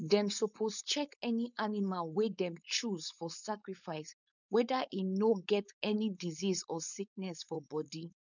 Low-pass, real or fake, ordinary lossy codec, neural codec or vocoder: 7.2 kHz; fake; none; codec, 16 kHz, 4.8 kbps, FACodec